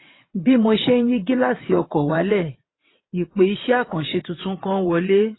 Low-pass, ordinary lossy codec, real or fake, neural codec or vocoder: 7.2 kHz; AAC, 16 kbps; fake; vocoder, 22.05 kHz, 80 mel bands, WaveNeXt